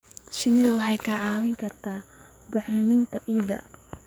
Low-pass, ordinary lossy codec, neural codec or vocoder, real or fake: none; none; codec, 44.1 kHz, 2.6 kbps, SNAC; fake